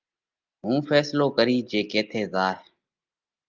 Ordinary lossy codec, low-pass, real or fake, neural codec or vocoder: Opus, 24 kbps; 7.2 kHz; real; none